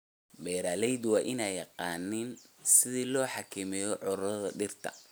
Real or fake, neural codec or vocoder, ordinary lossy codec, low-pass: real; none; none; none